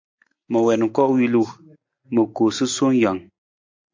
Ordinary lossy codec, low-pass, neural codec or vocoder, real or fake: MP3, 48 kbps; 7.2 kHz; none; real